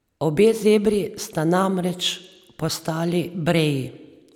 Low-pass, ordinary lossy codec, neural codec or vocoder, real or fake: 19.8 kHz; none; none; real